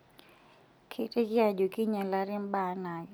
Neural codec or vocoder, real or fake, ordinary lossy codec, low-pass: none; real; none; none